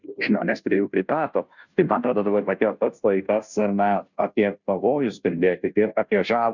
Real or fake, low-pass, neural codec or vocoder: fake; 7.2 kHz; codec, 16 kHz, 0.5 kbps, FunCodec, trained on Chinese and English, 25 frames a second